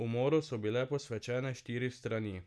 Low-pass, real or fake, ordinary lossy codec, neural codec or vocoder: none; real; none; none